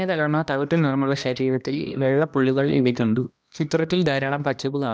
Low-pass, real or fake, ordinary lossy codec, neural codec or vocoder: none; fake; none; codec, 16 kHz, 1 kbps, X-Codec, HuBERT features, trained on balanced general audio